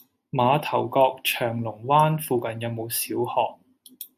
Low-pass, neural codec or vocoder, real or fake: 14.4 kHz; none; real